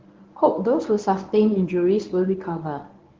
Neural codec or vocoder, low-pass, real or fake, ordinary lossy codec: codec, 24 kHz, 0.9 kbps, WavTokenizer, medium speech release version 1; 7.2 kHz; fake; Opus, 16 kbps